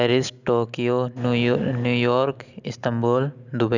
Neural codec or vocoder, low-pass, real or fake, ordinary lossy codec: none; 7.2 kHz; real; none